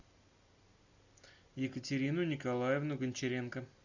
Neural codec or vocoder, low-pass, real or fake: none; 7.2 kHz; real